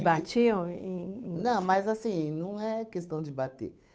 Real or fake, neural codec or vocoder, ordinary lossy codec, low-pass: fake; codec, 16 kHz, 8 kbps, FunCodec, trained on Chinese and English, 25 frames a second; none; none